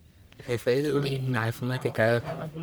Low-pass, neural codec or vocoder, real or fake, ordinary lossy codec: none; codec, 44.1 kHz, 1.7 kbps, Pupu-Codec; fake; none